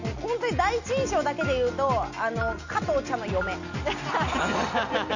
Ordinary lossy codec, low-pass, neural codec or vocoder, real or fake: none; 7.2 kHz; none; real